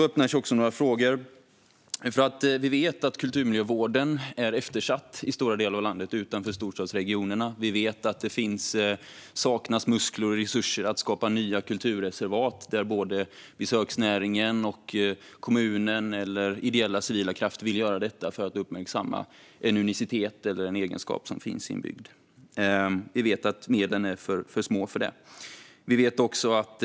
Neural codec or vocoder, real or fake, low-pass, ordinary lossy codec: none; real; none; none